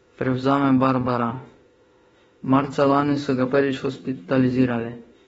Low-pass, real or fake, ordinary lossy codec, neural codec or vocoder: 19.8 kHz; fake; AAC, 24 kbps; autoencoder, 48 kHz, 32 numbers a frame, DAC-VAE, trained on Japanese speech